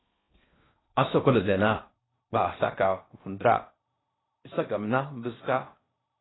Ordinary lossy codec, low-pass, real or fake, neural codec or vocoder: AAC, 16 kbps; 7.2 kHz; fake; codec, 16 kHz in and 24 kHz out, 0.6 kbps, FocalCodec, streaming, 4096 codes